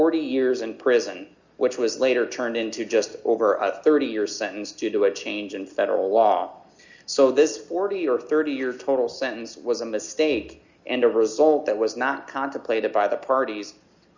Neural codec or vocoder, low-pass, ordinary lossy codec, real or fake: none; 7.2 kHz; Opus, 64 kbps; real